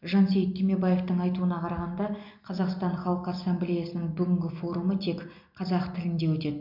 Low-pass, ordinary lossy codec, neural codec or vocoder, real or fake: 5.4 kHz; MP3, 48 kbps; none; real